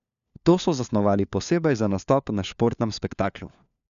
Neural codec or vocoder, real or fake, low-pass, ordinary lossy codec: codec, 16 kHz, 4 kbps, FunCodec, trained on LibriTTS, 50 frames a second; fake; 7.2 kHz; none